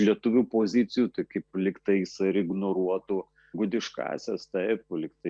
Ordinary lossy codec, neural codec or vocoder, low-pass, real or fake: Opus, 64 kbps; none; 9.9 kHz; real